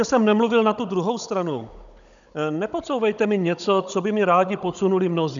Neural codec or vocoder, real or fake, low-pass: codec, 16 kHz, 16 kbps, FunCodec, trained on Chinese and English, 50 frames a second; fake; 7.2 kHz